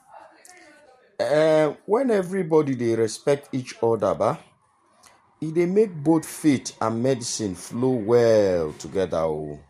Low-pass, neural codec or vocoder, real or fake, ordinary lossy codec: 14.4 kHz; vocoder, 44.1 kHz, 128 mel bands every 512 samples, BigVGAN v2; fake; MP3, 64 kbps